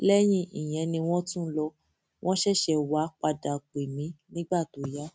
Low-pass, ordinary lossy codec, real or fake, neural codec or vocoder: none; none; real; none